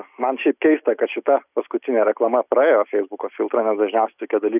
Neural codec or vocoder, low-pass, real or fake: none; 3.6 kHz; real